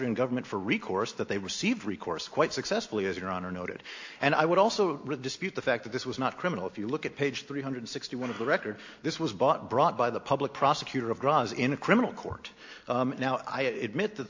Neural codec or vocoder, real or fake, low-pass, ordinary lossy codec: none; real; 7.2 kHz; AAC, 48 kbps